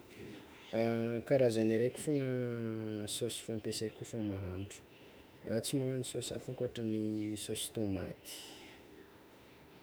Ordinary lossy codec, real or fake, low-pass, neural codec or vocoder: none; fake; none; autoencoder, 48 kHz, 32 numbers a frame, DAC-VAE, trained on Japanese speech